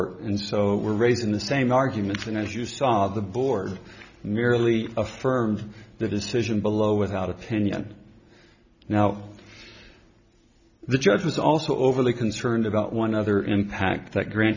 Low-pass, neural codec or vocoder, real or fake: 7.2 kHz; none; real